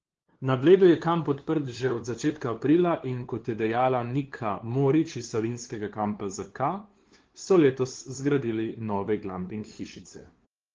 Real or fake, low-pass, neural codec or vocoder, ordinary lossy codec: fake; 7.2 kHz; codec, 16 kHz, 2 kbps, FunCodec, trained on LibriTTS, 25 frames a second; Opus, 16 kbps